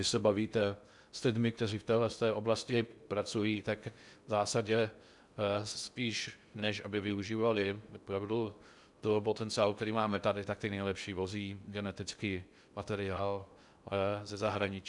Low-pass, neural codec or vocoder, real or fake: 10.8 kHz; codec, 16 kHz in and 24 kHz out, 0.6 kbps, FocalCodec, streaming, 2048 codes; fake